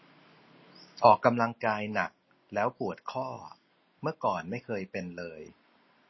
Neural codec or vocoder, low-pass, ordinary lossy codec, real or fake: none; 7.2 kHz; MP3, 24 kbps; real